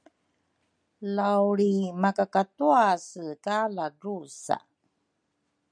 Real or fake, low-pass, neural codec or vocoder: real; 9.9 kHz; none